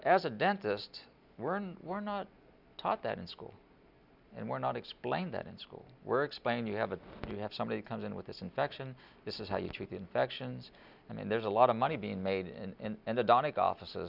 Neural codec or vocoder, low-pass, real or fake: none; 5.4 kHz; real